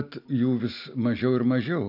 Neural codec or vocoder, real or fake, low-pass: none; real; 5.4 kHz